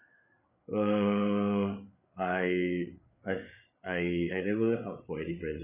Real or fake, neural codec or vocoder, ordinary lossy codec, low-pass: fake; codec, 16 kHz, 8 kbps, FreqCodec, larger model; none; 3.6 kHz